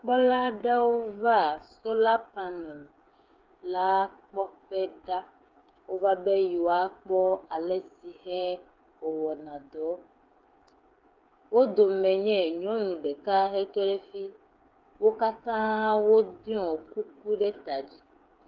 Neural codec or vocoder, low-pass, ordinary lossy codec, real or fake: codec, 16 kHz, 8 kbps, FreqCodec, smaller model; 7.2 kHz; Opus, 24 kbps; fake